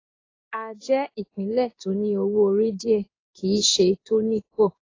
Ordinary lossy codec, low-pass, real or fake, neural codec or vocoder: AAC, 32 kbps; 7.2 kHz; fake; codec, 16 kHz in and 24 kHz out, 1 kbps, XY-Tokenizer